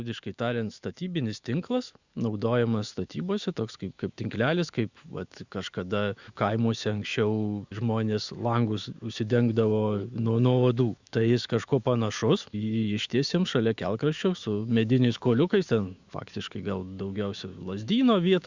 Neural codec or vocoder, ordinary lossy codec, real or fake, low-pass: autoencoder, 48 kHz, 128 numbers a frame, DAC-VAE, trained on Japanese speech; Opus, 64 kbps; fake; 7.2 kHz